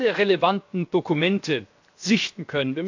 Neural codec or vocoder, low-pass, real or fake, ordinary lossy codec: codec, 16 kHz, 0.7 kbps, FocalCodec; 7.2 kHz; fake; AAC, 48 kbps